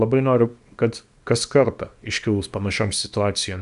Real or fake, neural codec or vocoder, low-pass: fake; codec, 24 kHz, 0.9 kbps, WavTokenizer, small release; 10.8 kHz